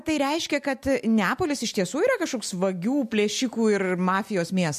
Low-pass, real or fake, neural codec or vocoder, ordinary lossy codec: 14.4 kHz; real; none; MP3, 64 kbps